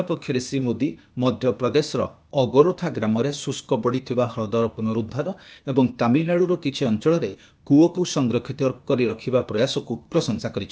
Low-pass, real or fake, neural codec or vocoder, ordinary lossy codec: none; fake; codec, 16 kHz, 0.8 kbps, ZipCodec; none